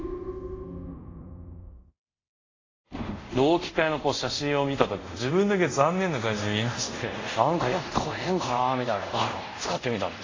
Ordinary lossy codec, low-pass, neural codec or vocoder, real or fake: AAC, 32 kbps; 7.2 kHz; codec, 24 kHz, 0.5 kbps, DualCodec; fake